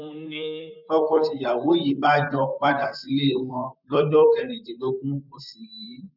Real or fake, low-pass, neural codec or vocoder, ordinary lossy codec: fake; 5.4 kHz; vocoder, 44.1 kHz, 128 mel bands, Pupu-Vocoder; none